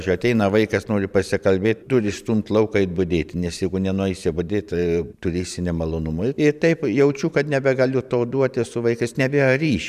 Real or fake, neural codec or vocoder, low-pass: fake; vocoder, 44.1 kHz, 128 mel bands every 512 samples, BigVGAN v2; 14.4 kHz